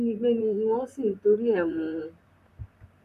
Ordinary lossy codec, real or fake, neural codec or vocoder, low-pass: none; fake; vocoder, 44.1 kHz, 128 mel bands, Pupu-Vocoder; 14.4 kHz